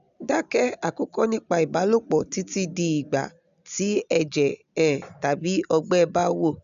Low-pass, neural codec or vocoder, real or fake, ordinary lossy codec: 7.2 kHz; none; real; AAC, 96 kbps